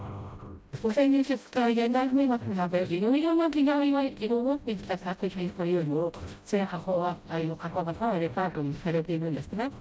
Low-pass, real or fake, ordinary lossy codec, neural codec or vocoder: none; fake; none; codec, 16 kHz, 0.5 kbps, FreqCodec, smaller model